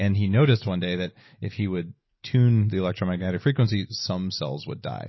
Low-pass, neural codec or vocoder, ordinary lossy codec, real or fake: 7.2 kHz; none; MP3, 24 kbps; real